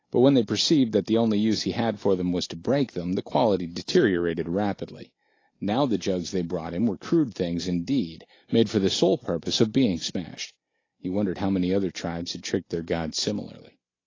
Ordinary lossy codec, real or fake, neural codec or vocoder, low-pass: AAC, 32 kbps; real; none; 7.2 kHz